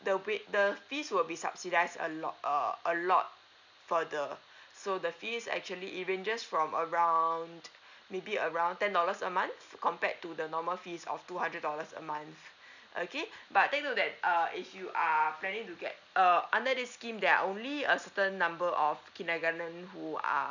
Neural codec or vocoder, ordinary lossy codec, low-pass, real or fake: none; none; 7.2 kHz; real